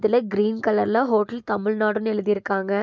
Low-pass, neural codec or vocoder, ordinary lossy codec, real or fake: none; none; none; real